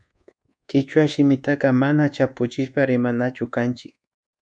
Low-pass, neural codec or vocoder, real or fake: 9.9 kHz; codec, 24 kHz, 1.2 kbps, DualCodec; fake